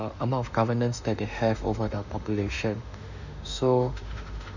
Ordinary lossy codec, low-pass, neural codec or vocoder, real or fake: none; 7.2 kHz; autoencoder, 48 kHz, 32 numbers a frame, DAC-VAE, trained on Japanese speech; fake